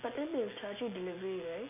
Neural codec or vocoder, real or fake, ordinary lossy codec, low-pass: none; real; MP3, 32 kbps; 3.6 kHz